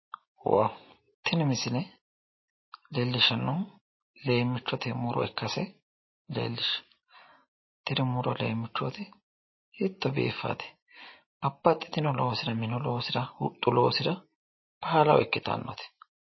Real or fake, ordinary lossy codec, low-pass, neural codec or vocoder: real; MP3, 24 kbps; 7.2 kHz; none